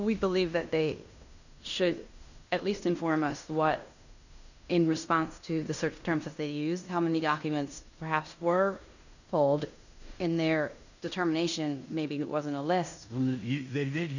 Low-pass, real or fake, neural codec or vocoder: 7.2 kHz; fake; codec, 16 kHz in and 24 kHz out, 0.9 kbps, LongCat-Audio-Codec, fine tuned four codebook decoder